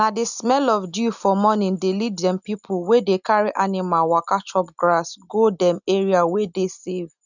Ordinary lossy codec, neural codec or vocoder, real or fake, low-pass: none; none; real; 7.2 kHz